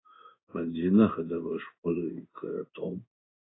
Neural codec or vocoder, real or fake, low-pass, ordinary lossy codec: codec, 16 kHz in and 24 kHz out, 1 kbps, XY-Tokenizer; fake; 7.2 kHz; AAC, 16 kbps